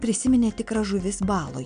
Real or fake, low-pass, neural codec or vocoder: real; 9.9 kHz; none